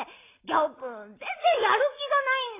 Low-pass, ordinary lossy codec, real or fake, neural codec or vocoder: 3.6 kHz; AAC, 16 kbps; real; none